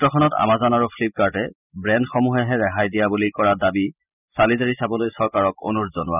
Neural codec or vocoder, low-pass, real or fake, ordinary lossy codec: none; 3.6 kHz; real; none